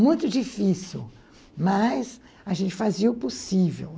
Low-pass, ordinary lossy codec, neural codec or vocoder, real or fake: none; none; none; real